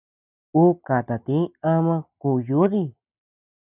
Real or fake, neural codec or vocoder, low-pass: real; none; 3.6 kHz